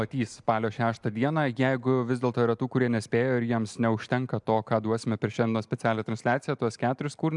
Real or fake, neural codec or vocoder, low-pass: real; none; 10.8 kHz